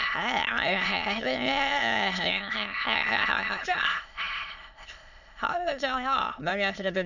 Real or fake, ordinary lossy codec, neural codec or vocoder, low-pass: fake; none; autoencoder, 22.05 kHz, a latent of 192 numbers a frame, VITS, trained on many speakers; 7.2 kHz